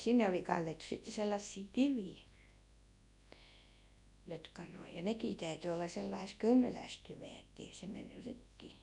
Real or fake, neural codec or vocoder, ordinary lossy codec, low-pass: fake; codec, 24 kHz, 0.9 kbps, WavTokenizer, large speech release; none; 10.8 kHz